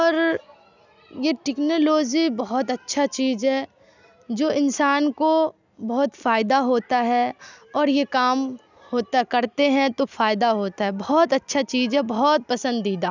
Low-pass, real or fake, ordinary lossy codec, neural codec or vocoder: 7.2 kHz; real; none; none